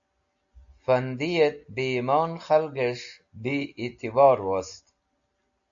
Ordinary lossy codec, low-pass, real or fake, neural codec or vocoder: AAC, 64 kbps; 7.2 kHz; real; none